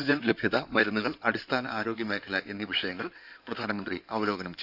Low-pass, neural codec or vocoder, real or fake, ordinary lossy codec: 5.4 kHz; codec, 16 kHz in and 24 kHz out, 2.2 kbps, FireRedTTS-2 codec; fake; none